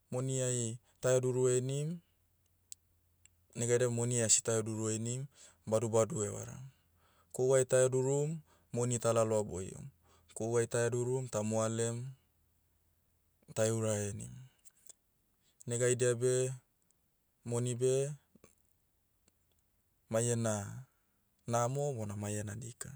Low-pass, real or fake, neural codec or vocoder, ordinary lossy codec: none; real; none; none